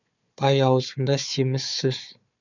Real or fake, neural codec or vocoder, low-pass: fake; codec, 16 kHz, 4 kbps, FunCodec, trained on Chinese and English, 50 frames a second; 7.2 kHz